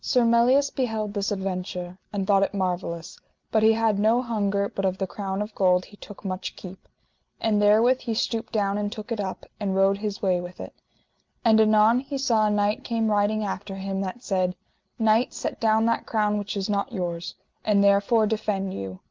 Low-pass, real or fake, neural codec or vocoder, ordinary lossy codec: 7.2 kHz; real; none; Opus, 32 kbps